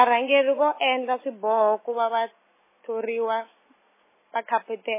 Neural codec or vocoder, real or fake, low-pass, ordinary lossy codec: none; real; 3.6 kHz; MP3, 16 kbps